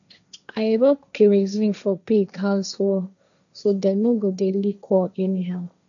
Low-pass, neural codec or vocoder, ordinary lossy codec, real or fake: 7.2 kHz; codec, 16 kHz, 1.1 kbps, Voila-Tokenizer; none; fake